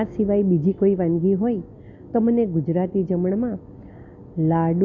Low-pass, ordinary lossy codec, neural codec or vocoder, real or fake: 7.2 kHz; none; none; real